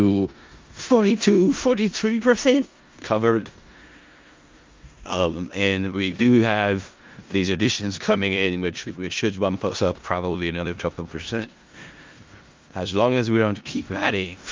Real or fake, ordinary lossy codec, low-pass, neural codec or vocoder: fake; Opus, 24 kbps; 7.2 kHz; codec, 16 kHz in and 24 kHz out, 0.4 kbps, LongCat-Audio-Codec, four codebook decoder